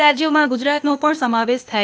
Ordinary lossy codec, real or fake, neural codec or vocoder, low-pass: none; fake; codec, 16 kHz, 0.8 kbps, ZipCodec; none